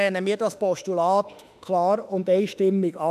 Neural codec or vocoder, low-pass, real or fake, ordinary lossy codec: autoencoder, 48 kHz, 32 numbers a frame, DAC-VAE, trained on Japanese speech; 14.4 kHz; fake; none